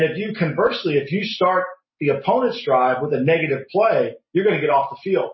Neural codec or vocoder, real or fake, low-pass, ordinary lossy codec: none; real; 7.2 kHz; MP3, 24 kbps